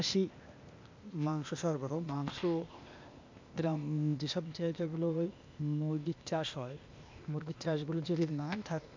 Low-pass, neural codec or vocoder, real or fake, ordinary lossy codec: 7.2 kHz; codec, 16 kHz, 0.8 kbps, ZipCodec; fake; MP3, 64 kbps